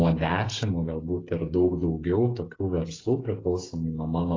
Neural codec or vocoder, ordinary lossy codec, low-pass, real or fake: codec, 16 kHz, 8 kbps, FreqCodec, smaller model; AAC, 32 kbps; 7.2 kHz; fake